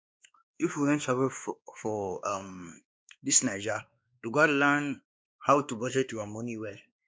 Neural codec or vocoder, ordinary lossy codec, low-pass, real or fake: codec, 16 kHz, 2 kbps, X-Codec, WavLM features, trained on Multilingual LibriSpeech; none; none; fake